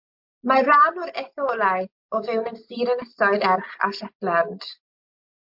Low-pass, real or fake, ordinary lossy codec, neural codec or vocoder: 5.4 kHz; real; MP3, 48 kbps; none